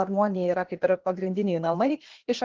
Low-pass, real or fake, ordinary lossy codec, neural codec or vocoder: 7.2 kHz; fake; Opus, 16 kbps; codec, 16 kHz, 0.8 kbps, ZipCodec